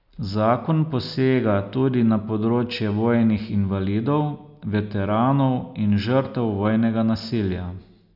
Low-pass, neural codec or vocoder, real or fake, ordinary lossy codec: 5.4 kHz; none; real; none